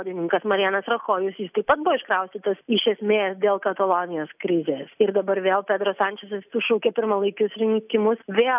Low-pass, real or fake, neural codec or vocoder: 3.6 kHz; real; none